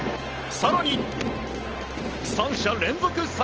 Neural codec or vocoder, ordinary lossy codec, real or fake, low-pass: none; Opus, 16 kbps; real; 7.2 kHz